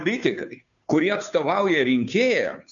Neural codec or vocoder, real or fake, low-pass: codec, 16 kHz, 2 kbps, FunCodec, trained on Chinese and English, 25 frames a second; fake; 7.2 kHz